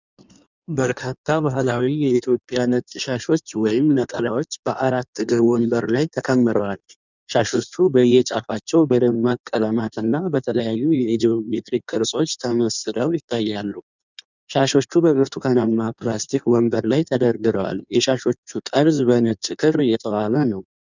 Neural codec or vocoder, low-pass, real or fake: codec, 16 kHz in and 24 kHz out, 1.1 kbps, FireRedTTS-2 codec; 7.2 kHz; fake